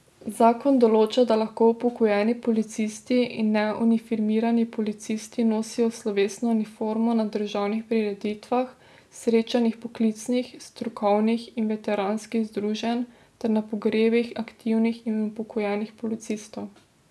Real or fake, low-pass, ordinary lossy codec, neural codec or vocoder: real; none; none; none